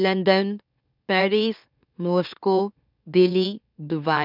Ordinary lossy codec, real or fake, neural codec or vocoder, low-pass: none; fake; autoencoder, 44.1 kHz, a latent of 192 numbers a frame, MeloTTS; 5.4 kHz